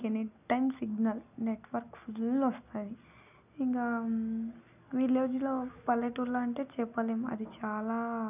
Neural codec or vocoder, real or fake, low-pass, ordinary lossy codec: none; real; 3.6 kHz; none